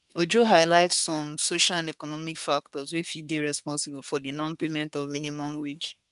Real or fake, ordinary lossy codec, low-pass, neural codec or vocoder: fake; none; 10.8 kHz; codec, 24 kHz, 1 kbps, SNAC